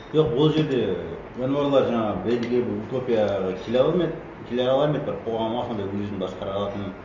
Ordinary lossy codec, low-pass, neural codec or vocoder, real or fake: none; 7.2 kHz; none; real